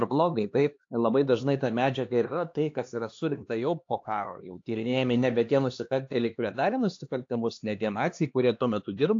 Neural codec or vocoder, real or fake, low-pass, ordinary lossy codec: codec, 16 kHz, 2 kbps, X-Codec, HuBERT features, trained on LibriSpeech; fake; 7.2 kHz; AAC, 48 kbps